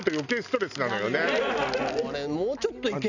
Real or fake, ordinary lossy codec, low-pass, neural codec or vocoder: real; none; 7.2 kHz; none